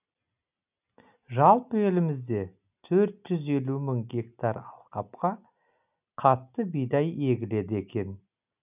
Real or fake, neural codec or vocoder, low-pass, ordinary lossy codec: real; none; 3.6 kHz; none